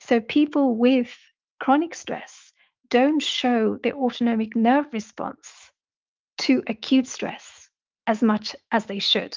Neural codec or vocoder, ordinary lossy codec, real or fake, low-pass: autoencoder, 48 kHz, 128 numbers a frame, DAC-VAE, trained on Japanese speech; Opus, 24 kbps; fake; 7.2 kHz